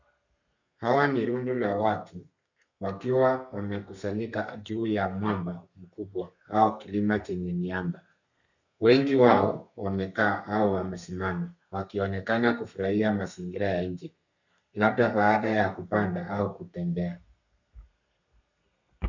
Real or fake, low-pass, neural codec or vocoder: fake; 7.2 kHz; codec, 32 kHz, 1.9 kbps, SNAC